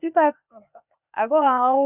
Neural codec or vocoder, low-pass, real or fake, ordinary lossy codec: codec, 16 kHz, 0.8 kbps, ZipCodec; 3.6 kHz; fake; none